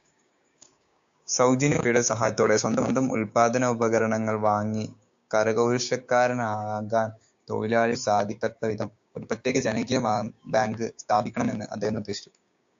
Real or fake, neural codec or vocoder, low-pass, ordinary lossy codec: fake; codec, 16 kHz, 6 kbps, DAC; 7.2 kHz; AAC, 64 kbps